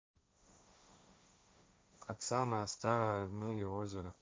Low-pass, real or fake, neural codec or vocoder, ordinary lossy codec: none; fake; codec, 16 kHz, 1.1 kbps, Voila-Tokenizer; none